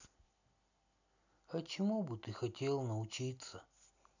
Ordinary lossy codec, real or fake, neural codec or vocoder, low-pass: none; real; none; 7.2 kHz